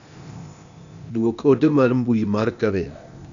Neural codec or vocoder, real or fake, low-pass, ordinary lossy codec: codec, 16 kHz, 0.8 kbps, ZipCodec; fake; 7.2 kHz; none